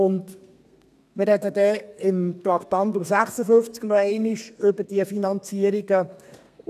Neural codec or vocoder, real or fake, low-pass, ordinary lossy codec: codec, 32 kHz, 1.9 kbps, SNAC; fake; 14.4 kHz; none